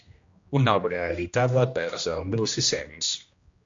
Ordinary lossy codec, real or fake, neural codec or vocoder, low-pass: MP3, 48 kbps; fake; codec, 16 kHz, 1 kbps, X-Codec, HuBERT features, trained on general audio; 7.2 kHz